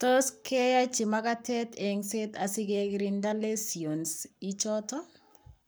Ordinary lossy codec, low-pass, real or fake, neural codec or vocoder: none; none; fake; codec, 44.1 kHz, 7.8 kbps, Pupu-Codec